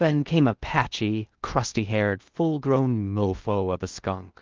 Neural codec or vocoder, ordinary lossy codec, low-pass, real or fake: codec, 16 kHz in and 24 kHz out, 0.8 kbps, FocalCodec, streaming, 65536 codes; Opus, 32 kbps; 7.2 kHz; fake